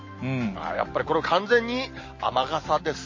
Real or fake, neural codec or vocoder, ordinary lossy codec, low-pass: real; none; MP3, 32 kbps; 7.2 kHz